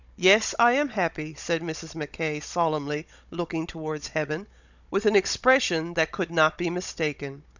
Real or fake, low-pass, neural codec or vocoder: fake; 7.2 kHz; codec, 16 kHz, 16 kbps, FunCodec, trained on Chinese and English, 50 frames a second